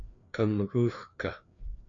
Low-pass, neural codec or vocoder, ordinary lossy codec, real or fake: 7.2 kHz; codec, 16 kHz, 2 kbps, FreqCodec, larger model; AAC, 64 kbps; fake